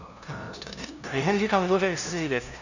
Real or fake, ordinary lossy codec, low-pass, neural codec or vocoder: fake; none; 7.2 kHz; codec, 16 kHz, 0.5 kbps, FunCodec, trained on LibriTTS, 25 frames a second